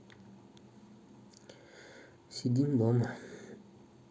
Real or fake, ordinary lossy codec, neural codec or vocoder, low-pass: real; none; none; none